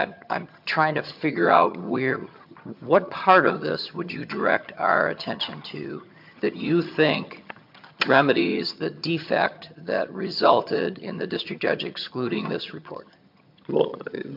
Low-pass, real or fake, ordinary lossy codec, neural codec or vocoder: 5.4 kHz; fake; MP3, 48 kbps; vocoder, 22.05 kHz, 80 mel bands, HiFi-GAN